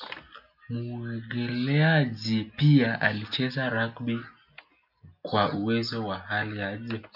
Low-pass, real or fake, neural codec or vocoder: 5.4 kHz; real; none